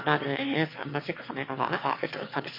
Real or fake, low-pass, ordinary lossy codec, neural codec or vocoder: fake; 5.4 kHz; MP3, 32 kbps; autoencoder, 22.05 kHz, a latent of 192 numbers a frame, VITS, trained on one speaker